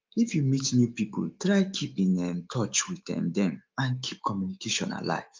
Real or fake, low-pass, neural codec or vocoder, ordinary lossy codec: fake; 7.2 kHz; autoencoder, 48 kHz, 128 numbers a frame, DAC-VAE, trained on Japanese speech; Opus, 32 kbps